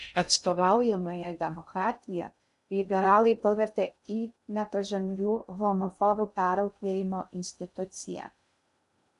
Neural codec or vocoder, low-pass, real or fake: codec, 16 kHz in and 24 kHz out, 0.6 kbps, FocalCodec, streaming, 2048 codes; 10.8 kHz; fake